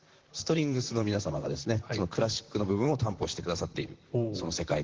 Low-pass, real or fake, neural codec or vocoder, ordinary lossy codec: 7.2 kHz; fake; vocoder, 44.1 kHz, 128 mel bands, Pupu-Vocoder; Opus, 16 kbps